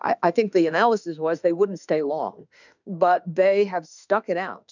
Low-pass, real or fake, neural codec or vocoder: 7.2 kHz; fake; autoencoder, 48 kHz, 32 numbers a frame, DAC-VAE, trained on Japanese speech